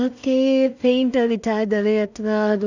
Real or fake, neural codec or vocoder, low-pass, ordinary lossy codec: fake; codec, 16 kHz in and 24 kHz out, 0.4 kbps, LongCat-Audio-Codec, two codebook decoder; 7.2 kHz; none